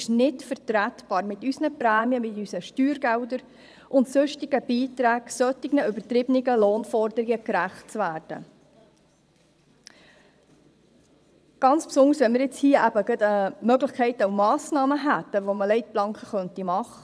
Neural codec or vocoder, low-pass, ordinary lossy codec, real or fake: vocoder, 22.05 kHz, 80 mel bands, Vocos; none; none; fake